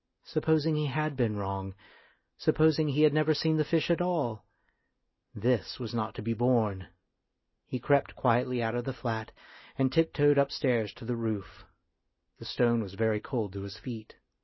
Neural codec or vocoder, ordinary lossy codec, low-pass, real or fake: none; MP3, 24 kbps; 7.2 kHz; real